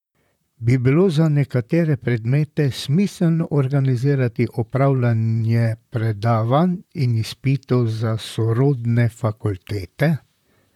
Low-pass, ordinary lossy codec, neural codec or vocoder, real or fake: 19.8 kHz; none; vocoder, 44.1 kHz, 128 mel bands, Pupu-Vocoder; fake